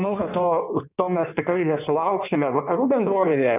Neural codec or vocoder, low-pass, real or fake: codec, 16 kHz in and 24 kHz out, 1.1 kbps, FireRedTTS-2 codec; 3.6 kHz; fake